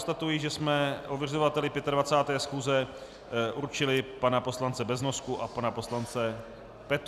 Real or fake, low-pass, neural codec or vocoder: real; 14.4 kHz; none